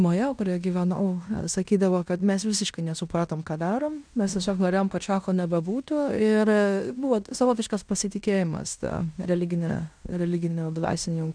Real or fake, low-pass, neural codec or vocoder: fake; 9.9 kHz; codec, 16 kHz in and 24 kHz out, 0.9 kbps, LongCat-Audio-Codec, fine tuned four codebook decoder